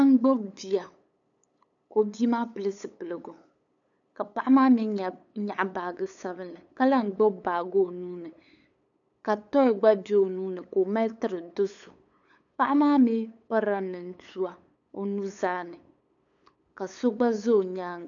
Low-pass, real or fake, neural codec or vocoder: 7.2 kHz; fake; codec, 16 kHz, 8 kbps, FunCodec, trained on LibriTTS, 25 frames a second